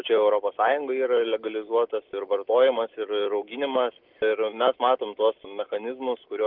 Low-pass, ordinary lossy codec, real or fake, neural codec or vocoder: 5.4 kHz; Opus, 32 kbps; real; none